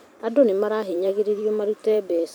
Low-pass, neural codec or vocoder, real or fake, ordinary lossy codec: none; vocoder, 44.1 kHz, 128 mel bands every 512 samples, BigVGAN v2; fake; none